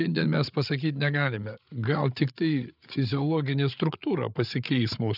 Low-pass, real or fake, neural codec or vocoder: 5.4 kHz; fake; codec, 16 kHz, 8 kbps, FreqCodec, larger model